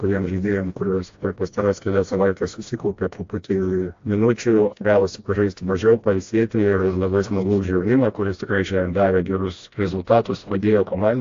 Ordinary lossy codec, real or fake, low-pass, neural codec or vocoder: AAC, 48 kbps; fake; 7.2 kHz; codec, 16 kHz, 1 kbps, FreqCodec, smaller model